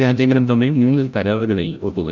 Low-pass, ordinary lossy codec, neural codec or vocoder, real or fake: 7.2 kHz; none; codec, 16 kHz, 0.5 kbps, FreqCodec, larger model; fake